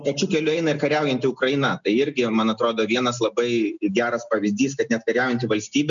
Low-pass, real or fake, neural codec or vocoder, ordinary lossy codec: 7.2 kHz; real; none; MP3, 96 kbps